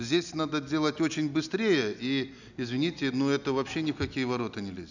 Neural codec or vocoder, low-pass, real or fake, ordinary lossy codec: none; 7.2 kHz; real; none